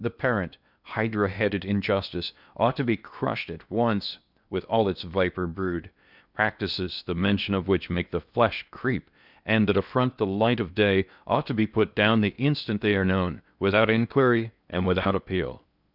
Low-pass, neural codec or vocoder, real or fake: 5.4 kHz; codec, 16 kHz in and 24 kHz out, 0.8 kbps, FocalCodec, streaming, 65536 codes; fake